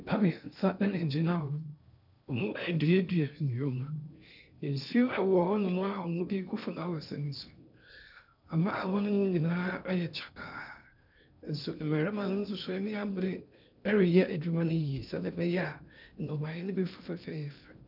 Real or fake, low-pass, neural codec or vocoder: fake; 5.4 kHz; codec, 16 kHz in and 24 kHz out, 0.6 kbps, FocalCodec, streaming, 2048 codes